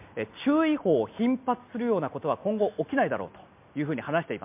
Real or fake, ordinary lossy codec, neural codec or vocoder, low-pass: real; MP3, 32 kbps; none; 3.6 kHz